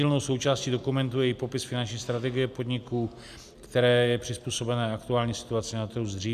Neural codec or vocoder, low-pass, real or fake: none; 14.4 kHz; real